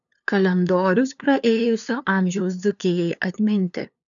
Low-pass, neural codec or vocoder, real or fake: 7.2 kHz; codec, 16 kHz, 2 kbps, FunCodec, trained on LibriTTS, 25 frames a second; fake